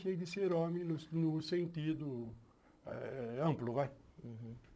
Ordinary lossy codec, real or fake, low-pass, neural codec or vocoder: none; fake; none; codec, 16 kHz, 16 kbps, FunCodec, trained on Chinese and English, 50 frames a second